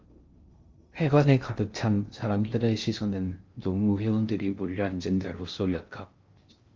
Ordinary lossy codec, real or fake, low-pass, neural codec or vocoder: Opus, 32 kbps; fake; 7.2 kHz; codec, 16 kHz in and 24 kHz out, 0.6 kbps, FocalCodec, streaming, 2048 codes